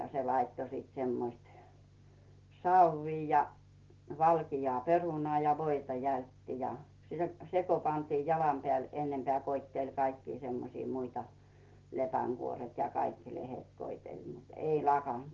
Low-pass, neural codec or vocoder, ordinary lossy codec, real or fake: 7.2 kHz; none; Opus, 16 kbps; real